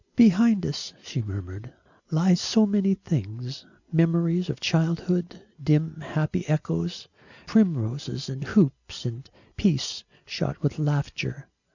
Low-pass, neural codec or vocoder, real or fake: 7.2 kHz; none; real